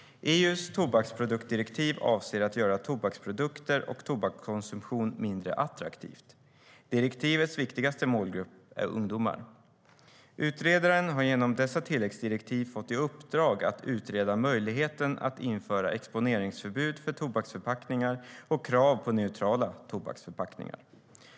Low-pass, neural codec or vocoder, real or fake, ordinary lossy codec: none; none; real; none